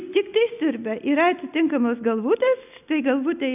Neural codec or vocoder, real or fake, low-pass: none; real; 3.6 kHz